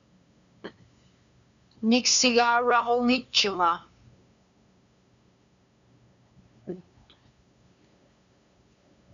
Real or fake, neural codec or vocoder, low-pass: fake; codec, 16 kHz, 2 kbps, FunCodec, trained on LibriTTS, 25 frames a second; 7.2 kHz